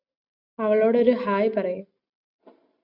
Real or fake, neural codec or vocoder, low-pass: real; none; 5.4 kHz